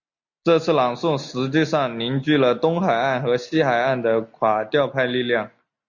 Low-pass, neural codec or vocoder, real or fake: 7.2 kHz; none; real